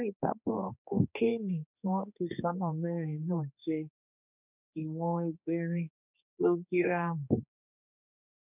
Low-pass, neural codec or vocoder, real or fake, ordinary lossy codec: 3.6 kHz; codec, 16 kHz, 2 kbps, X-Codec, HuBERT features, trained on general audio; fake; none